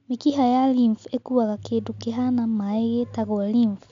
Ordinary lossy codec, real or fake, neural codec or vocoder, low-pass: MP3, 64 kbps; real; none; 7.2 kHz